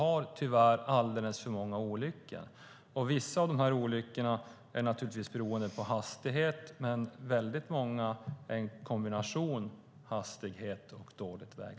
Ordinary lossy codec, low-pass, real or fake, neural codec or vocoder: none; none; real; none